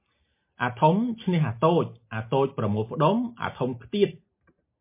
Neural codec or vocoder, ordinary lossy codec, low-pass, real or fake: none; MP3, 24 kbps; 3.6 kHz; real